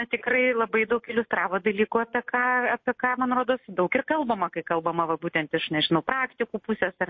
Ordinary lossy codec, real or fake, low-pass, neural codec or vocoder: MP3, 32 kbps; real; 7.2 kHz; none